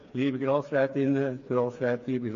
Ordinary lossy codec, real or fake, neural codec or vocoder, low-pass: AAC, 48 kbps; fake; codec, 16 kHz, 4 kbps, FreqCodec, smaller model; 7.2 kHz